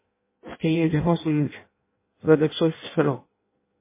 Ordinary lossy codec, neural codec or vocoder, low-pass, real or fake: MP3, 16 kbps; codec, 16 kHz in and 24 kHz out, 0.6 kbps, FireRedTTS-2 codec; 3.6 kHz; fake